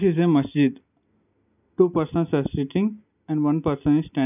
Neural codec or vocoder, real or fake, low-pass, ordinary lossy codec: none; real; 3.6 kHz; none